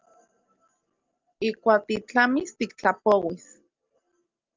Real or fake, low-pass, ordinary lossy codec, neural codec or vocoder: real; 7.2 kHz; Opus, 32 kbps; none